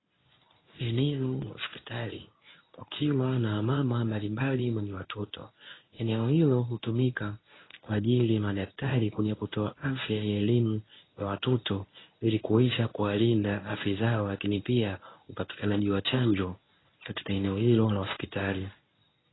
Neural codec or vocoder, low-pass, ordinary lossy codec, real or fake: codec, 24 kHz, 0.9 kbps, WavTokenizer, medium speech release version 1; 7.2 kHz; AAC, 16 kbps; fake